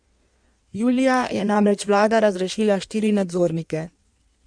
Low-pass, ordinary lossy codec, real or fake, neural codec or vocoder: 9.9 kHz; none; fake; codec, 16 kHz in and 24 kHz out, 1.1 kbps, FireRedTTS-2 codec